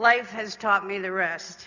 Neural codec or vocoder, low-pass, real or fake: none; 7.2 kHz; real